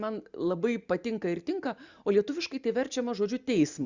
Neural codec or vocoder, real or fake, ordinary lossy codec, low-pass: none; real; Opus, 64 kbps; 7.2 kHz